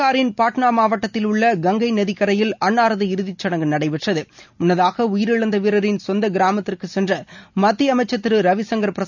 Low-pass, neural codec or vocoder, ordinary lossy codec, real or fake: 7.2 kHz; none; none; real